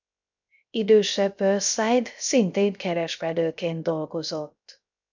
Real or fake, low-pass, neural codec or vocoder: fake; 7.2 kHz; codec, 16 kHz, 0.3 kbps, FocalCodec